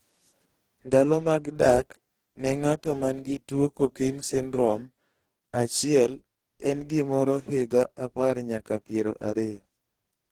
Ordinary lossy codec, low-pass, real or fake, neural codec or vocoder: Opus, 16 kbps; 19.8 kHz; fake; codec, 44.1 kHz, 2.6 kbps, DAC